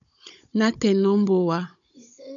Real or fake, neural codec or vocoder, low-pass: fake; codec, 16 kHz, 16 kbps, FunCodec, trained on Chinese and English, 50 frames a second; 7.2 kHz